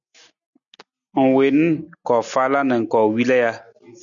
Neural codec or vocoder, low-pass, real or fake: none; 7.2 kHz; real